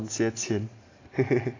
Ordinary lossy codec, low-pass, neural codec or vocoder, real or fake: AAC, 32 kbps; 7.2 kHz; none; real